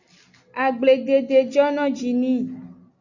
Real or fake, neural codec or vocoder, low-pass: real; none; 7.2 kHz